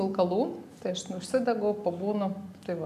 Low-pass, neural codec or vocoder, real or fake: 14.4 kHz; none; real